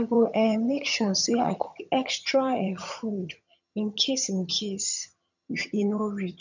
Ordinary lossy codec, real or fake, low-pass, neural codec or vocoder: none; fake; 7.2 kHz; vocoder, 22.05 kHz, 80 mel bands, HiFi-GAN